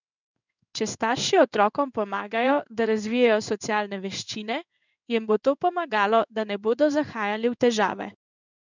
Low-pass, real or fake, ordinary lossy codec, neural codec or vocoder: 7.2 kHz; fake; none; codec, 16 kHz in and 24 kHz out, 1 kbps, XY-Tokenizer